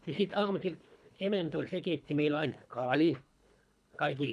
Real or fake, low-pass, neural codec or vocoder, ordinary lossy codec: fake; none; codec, 24 kHz, 3 kbps, HILCodec; none